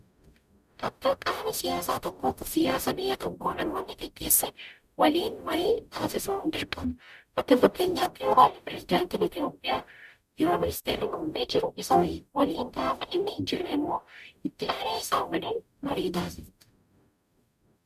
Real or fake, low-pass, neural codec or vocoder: fake; 14.4 kHz; codec, 44.1 kHz, 0.9 kbps, DAC